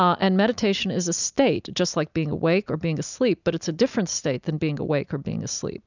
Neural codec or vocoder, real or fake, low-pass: none; real; 7.2 kHz